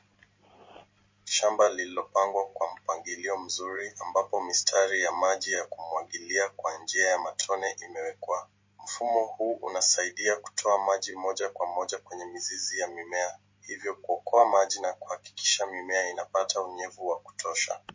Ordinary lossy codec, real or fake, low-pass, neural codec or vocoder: MP3, 32 kbps; real; 7.2 kHz; none